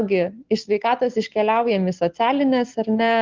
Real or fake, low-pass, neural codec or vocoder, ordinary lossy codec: real; 7.2 kHz; none; Opus, 16 kbps